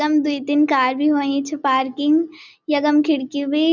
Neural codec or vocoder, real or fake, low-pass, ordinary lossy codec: none; real; 7.2 kHz; none